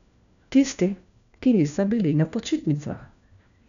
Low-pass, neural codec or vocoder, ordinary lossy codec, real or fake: 7.2 kHz; codec, 16 kHz, 1 kbps, FunCodec, trained on LibriTTS, 50 frames a second; none; fake